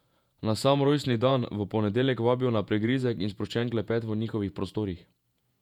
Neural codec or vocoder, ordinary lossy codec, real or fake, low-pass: vocoder, 48 kHz, 128 mel bands, Vocos; none; fake; 19.8 kHz